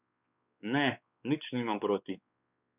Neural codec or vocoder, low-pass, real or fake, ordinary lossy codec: codec, 16 kHz, 4 kbps, X-Codec, WavLM features, trained on Multilingual LibriSpeech; 3.6 kHz; fake; none